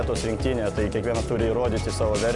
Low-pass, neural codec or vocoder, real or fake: 10.8 kHz; none; real